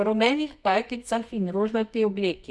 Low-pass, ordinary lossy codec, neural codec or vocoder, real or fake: none; none; codec, 24 kHz, 0.9 kbps, WavTokenizer, medium music audio release; fake